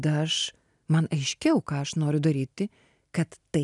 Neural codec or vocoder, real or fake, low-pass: none; real; 10.8 kHz